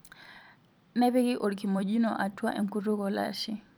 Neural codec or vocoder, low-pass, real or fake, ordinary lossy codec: vocoder, 44.1 kHz, 128 mel bands every 256 samples, BigVGAN v2; none; fake; none